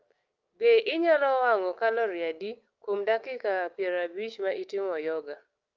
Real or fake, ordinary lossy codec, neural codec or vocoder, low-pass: fake; Opus, 32 kbps; codec, 16 kHz, 6 kbps, DAC; 7.2 kHz